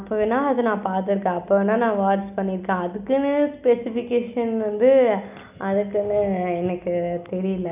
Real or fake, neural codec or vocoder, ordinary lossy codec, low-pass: real; none; none; 3.6 kHz